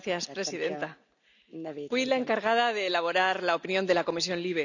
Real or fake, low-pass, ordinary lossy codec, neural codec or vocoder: real; 7.2 kHz; none; none